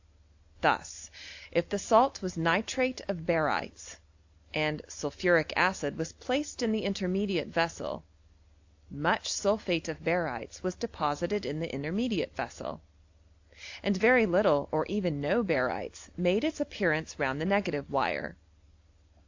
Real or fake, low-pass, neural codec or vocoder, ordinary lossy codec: real; 7.2 kHz; none; AAC, 48 kbps